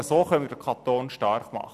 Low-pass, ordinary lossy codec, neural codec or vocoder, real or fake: 14.4 kHz; none; none; real